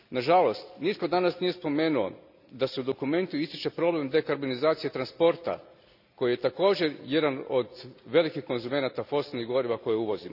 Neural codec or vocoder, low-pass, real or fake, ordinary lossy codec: none; 5.4 kHz; real; none